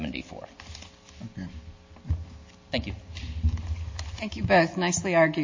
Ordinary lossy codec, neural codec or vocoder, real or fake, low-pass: MP3, 32 kbps; none; real; 7.2 kHz